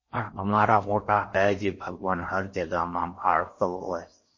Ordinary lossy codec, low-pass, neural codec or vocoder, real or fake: MP3, 32 kbps; 7.2 kHz; codec, 16 kHz in and 24 kHz out, 0.6 kbps, FocalCodec, streaming, 4096 codes; fake